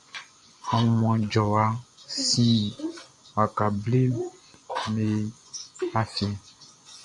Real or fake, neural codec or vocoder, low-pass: fake; vocoder, 24 kHz, 100 mel bands, Vocos; 10.8 kHz